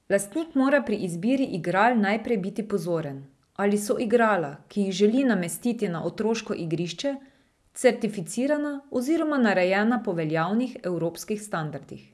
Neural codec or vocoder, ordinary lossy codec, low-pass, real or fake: none; none; none; real